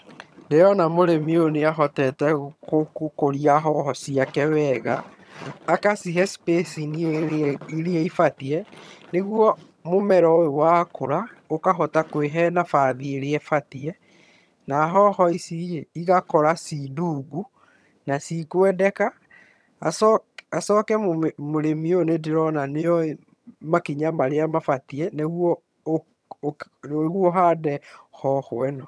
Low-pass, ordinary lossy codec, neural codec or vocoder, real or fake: none; none; vocoder, 22.05 kHz, 80 mel bands, HiFi-GAN; fake